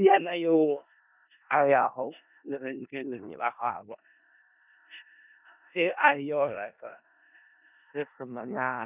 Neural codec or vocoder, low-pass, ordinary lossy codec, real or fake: codec, 16 kHz in and 24 kHz out, 0.4 kbps, LongCat-Audio-Codec, four codebook decoder; 3.6 kHz; none; fake